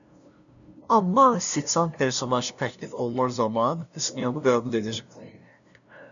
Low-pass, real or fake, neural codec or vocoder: 7.2 kHz; fake; codec, 16 kHz, 0.5 kbps, FunCodec, trained on LibriTTS, 25 frames a second